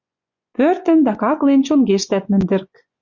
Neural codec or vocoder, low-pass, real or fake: vocoder, 24 kHz, 100 mel bands, Vocos; 7.2 kHz; fake